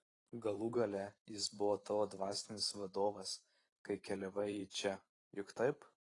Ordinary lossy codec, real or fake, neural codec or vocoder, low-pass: AAC, 32 kbps; fake; vocoder, 44.1 kHz, 128 mel bands every 512 samples, BigVGAN v2; 10.8 kHz